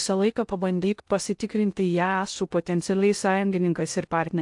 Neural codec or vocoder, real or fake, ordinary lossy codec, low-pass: codec, 16 kHz in and 24 kHz out, 0.6 kbps, FocalCodec, streaming, 2048 codes; fake; AAC, 64 kbps; 10.8 kHz